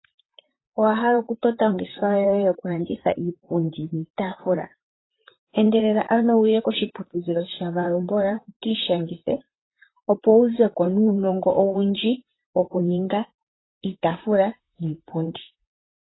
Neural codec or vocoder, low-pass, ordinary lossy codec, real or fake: vocoder, 44.1 kHz, 128 mel bands, Pupu-Vocoder; 7.2 kHz; AAC, 16 kbps; fake